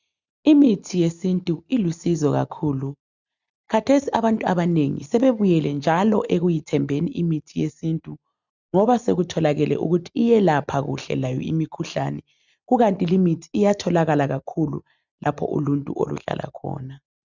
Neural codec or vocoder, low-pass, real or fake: none; 7.2 kHz; real